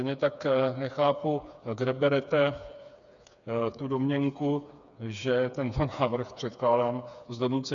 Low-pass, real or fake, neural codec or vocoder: 7.2 kHz; fake; codec, 16 kHz, 4 kbps, FreqCodec, smaller model